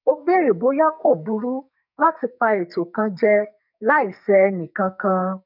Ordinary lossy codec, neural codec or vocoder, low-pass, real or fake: none; codec, 44.1 kHz, 2.6 kbps, SNAC; 5.4 kHz; fake